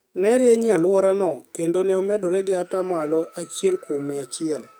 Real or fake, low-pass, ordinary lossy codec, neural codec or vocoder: fake; none; none; codec, 44.1 kHz, 2.6 kbps, SNAC